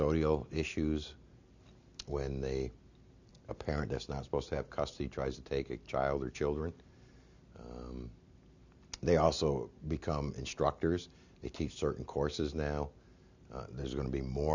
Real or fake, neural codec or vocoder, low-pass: real; none; 7.2 kHz